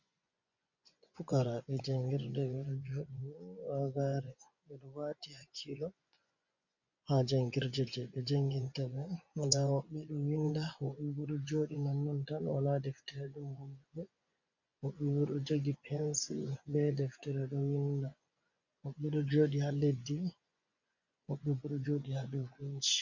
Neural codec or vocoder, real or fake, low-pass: vocoder, 24 kHz, 100 mel bands, Vocos; fake; 7.2 kHz